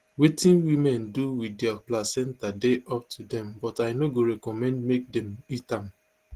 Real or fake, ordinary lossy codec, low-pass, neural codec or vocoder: real; Opus, 16 kbps; 14.4 kHz; none